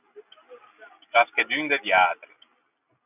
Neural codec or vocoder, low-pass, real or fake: none; 3.6 kHz; real